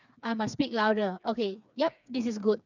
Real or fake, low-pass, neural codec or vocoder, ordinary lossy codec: fake; 7.2 kHz; codec, 16 kHz, 4 kbps, FreqCodec, smaller model; none